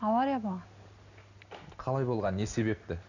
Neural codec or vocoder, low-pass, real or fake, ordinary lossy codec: none; 7.2 kHz; real; none